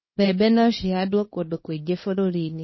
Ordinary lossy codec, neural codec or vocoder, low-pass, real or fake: MP3, 24 kbps; codec, 24 kHz, 0.9 kbps, WavTokenizer, small release; 7.2 kHz; fake